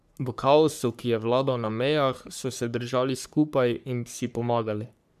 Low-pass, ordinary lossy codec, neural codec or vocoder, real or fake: 14.4 kHz; none; codec, 44.1 kHz, 3.4 kbps, Pupu-Codec; fake